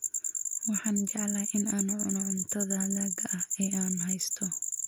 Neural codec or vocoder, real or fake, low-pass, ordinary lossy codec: none; real; none; none